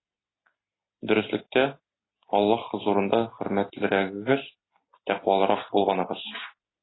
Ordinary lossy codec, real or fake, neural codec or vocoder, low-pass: AAC, 16 kbps; real; none; 7.2 kHz